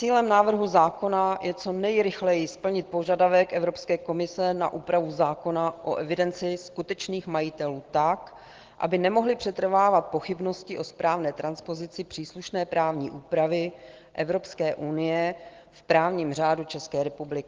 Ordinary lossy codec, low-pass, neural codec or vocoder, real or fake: Opus, 16 kbps; 7.2 kHz; none; real